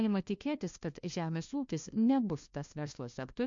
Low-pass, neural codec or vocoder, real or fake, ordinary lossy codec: 7.2 kHz; codec, 16 kHz, 1 kbps, FunCodec, trained on LibriTTS, 50 frames a second; fake; MP3, 48 kbps